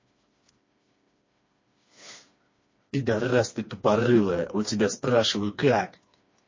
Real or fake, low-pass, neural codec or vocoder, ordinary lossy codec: fake; 7.2 kHz; codec, 16 kHz, 2 kbps, FreqCodec, smaller model; MP3, 32 kbps